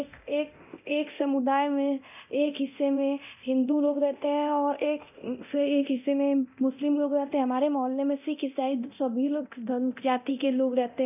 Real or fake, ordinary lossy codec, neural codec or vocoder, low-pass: fake; none; codec, 24 kHz, 0.9 kbps, DualCodec; 3.6 kHz